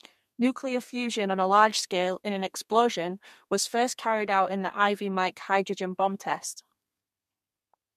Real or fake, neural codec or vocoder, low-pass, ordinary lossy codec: fake; codec, 32 kHz, 1.9 kbps, SNAC; 14.4 kHz; MP3, 64 kbps